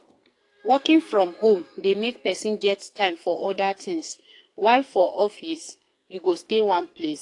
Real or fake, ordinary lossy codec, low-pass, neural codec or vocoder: fake; AAC, 48 kbps; 10.8 kHz; codec, 44.1 kHz, 2.6 kbps, SNAC